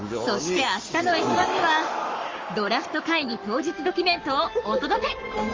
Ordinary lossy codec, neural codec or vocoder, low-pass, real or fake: Opus, 32 kbps; codec, 44.1 kHz, 7.8 kbps, Pupu-Codec; 7.2 kHz; fake